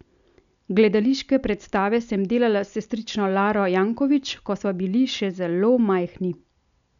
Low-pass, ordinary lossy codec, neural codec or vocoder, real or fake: 7.2 kHz; none; none; real